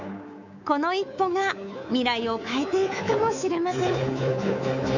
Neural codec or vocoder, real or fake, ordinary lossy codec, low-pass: codec, 24 kHz, 3.1 kbps, DualCodec; fake; none; 7.2 kHz